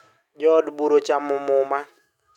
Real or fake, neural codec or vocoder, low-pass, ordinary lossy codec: fake; autoencoder, 48 kHz, 128 numbers a frame, DAC-VAE, trained on Japanese speech; 19.8 kHz; MP3, 96 kbps